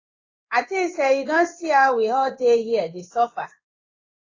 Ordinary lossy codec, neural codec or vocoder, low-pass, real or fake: AAC, 32 kbps; codec, 16 kHz in and 24 kHz out, 1 kbps, XY-Tokenizer; 7.2 kHz; fake